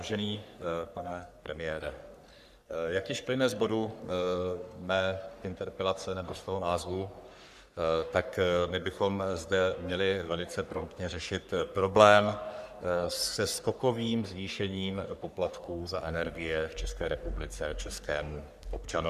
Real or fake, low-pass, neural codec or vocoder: fake; 14.4 kHz; codec, 44.1 kHz, 3.4 kbps, Pupu-Codec